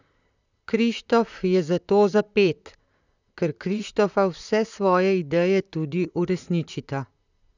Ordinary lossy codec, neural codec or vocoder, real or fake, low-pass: none; vocoder, 44.1 kHz, 128 mel bands, Pupu-Vocoder; fake; 7.2 kHz